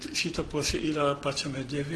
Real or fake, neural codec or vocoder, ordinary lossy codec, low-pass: real; none; Opus, 16 kbps; 10.8 kHz